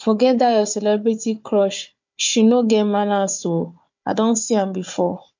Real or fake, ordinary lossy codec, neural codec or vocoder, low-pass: fake; MP3, 48 kbps; codec, 16 kHz, 4 kbps, FunCodec, trained on Chinese and English, 50 frames a second; 7.2 kHz